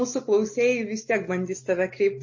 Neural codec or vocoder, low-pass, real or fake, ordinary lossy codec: none; 7.2 kHz; real; MP3, 32 kbps